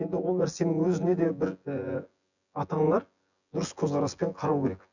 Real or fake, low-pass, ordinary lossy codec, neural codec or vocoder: fake; 7.2 kHz; none; vocoder, 24 kHz, 100 mel bands, Vocos